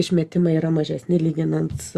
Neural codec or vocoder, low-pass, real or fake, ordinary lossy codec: none; 14.4 kHz; real; Opus, 64 kbps